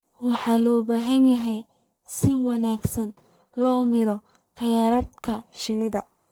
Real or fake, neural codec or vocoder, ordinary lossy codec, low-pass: fake; codec, 44.1 kHz, 1.7 kbps, Pupu-Codec; none; none